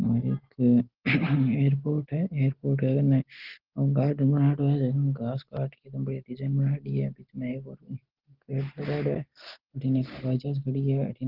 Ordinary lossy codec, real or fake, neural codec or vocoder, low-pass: Opus, 16 kbps; real; none; 5.4 kHz